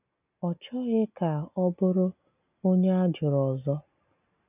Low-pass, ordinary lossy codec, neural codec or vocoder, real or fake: 3.6 kHz; none; none; real